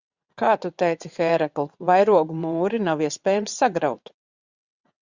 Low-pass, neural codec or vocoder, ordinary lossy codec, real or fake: 7.2 kHz; vocoder, 22.05 kHz, 80 mel bands, WaveNeXt; Opus, 64 kbps; fake